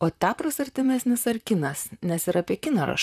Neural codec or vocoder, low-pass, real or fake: vocoder, 44.1 kHz, 128 mel bands, Pupu-Vocoder; 14.4 kHz; fake